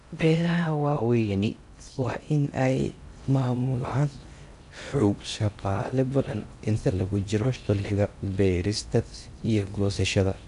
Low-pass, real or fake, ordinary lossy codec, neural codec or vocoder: 10.8 kHz; fake; none; codec, 16 kHz in and 24 kHz out, 0.6 kbps, FocalCodec, streaming, 4096 codes